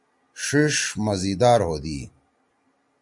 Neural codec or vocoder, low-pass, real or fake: none; 10.8 kHz; real